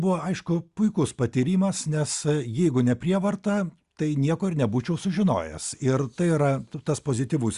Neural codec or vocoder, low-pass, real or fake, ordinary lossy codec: none; 10.8 kHz; real; Opus, 64 kbps